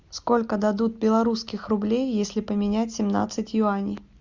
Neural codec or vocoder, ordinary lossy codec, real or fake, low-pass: none; Opus, 64 kbps; real; 7.2 kHz